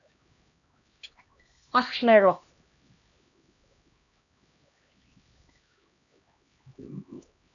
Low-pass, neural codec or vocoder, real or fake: 7.2 kHz; codec, 16 kHz, 1 kbps, X-Codec, HuBERT features, trained on LibriSpeech; fake